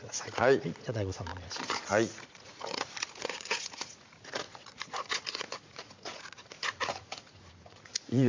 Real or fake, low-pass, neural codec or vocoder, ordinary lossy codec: fake; 7.2 kHz; vocoder, 44.1 kHz, 80 mel bands, Vocos; MP3, 48 kbps